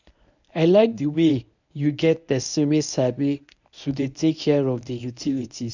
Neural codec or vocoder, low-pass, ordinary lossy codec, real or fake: codec, 24 kHz, 0.9 kbps, WavTokenizer, medium speech release version 1; 7.2 kHz; none; fake